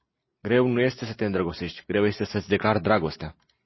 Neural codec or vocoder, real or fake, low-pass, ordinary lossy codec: none; real; 7.2 kHz; MP3, 24 kbps